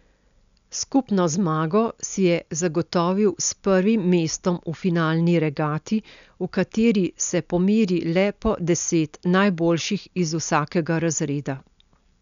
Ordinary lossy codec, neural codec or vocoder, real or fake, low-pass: none; none; real; 7.2 kHz